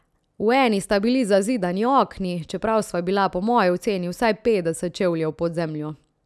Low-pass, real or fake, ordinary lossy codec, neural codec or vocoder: none; real; none; none